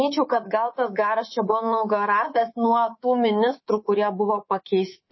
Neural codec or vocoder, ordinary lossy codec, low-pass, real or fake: codec, 24 kHz, 3.1 kbps, DualCodec; MP3, 24 kbps; 7.2 kHz; fake